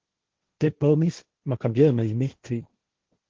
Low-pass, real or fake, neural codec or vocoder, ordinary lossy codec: 7.2 kHz; fake; codec, 16 kHz, 1.1 kbps, Voila-Tokenizer; Opus, 16 kbps